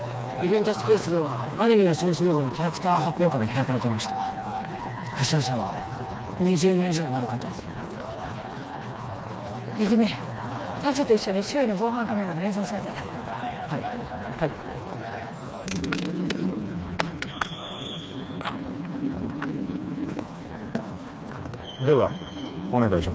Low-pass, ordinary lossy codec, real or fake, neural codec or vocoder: none; none; fake; codec, 16 kHz, 2 kbps, FreqCodec, smaller model